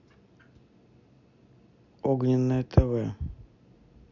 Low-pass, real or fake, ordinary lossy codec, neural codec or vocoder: 7.2 kHz; real; none; none